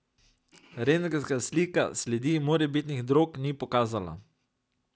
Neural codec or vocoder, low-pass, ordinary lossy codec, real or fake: none; none; none; real